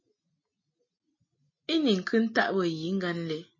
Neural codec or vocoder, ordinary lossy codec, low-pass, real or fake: none; MP3, 32 kbps; 7.2 kHz; real